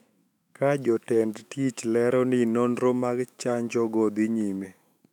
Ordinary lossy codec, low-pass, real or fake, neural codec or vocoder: none; 19.8 kHz; fake; autoencoder, 48 kHz, 128 numbers a frame, DAC-VAE, trained on Japanese speech